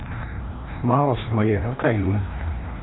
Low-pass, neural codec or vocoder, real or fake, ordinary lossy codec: 7.2 kHz; codec, 16 kHz, 1 kbps, FreqCodec, larger model; fake; AAC, 16 kbps